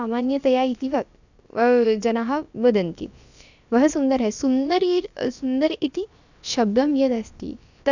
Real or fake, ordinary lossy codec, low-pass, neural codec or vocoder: fake; none; 7.2 kHz; codec, 16 kHz, about 1 kbps, DyCAST, with the encoder's durations